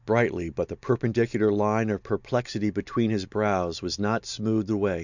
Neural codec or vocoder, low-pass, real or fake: none; 7.2 kHz; real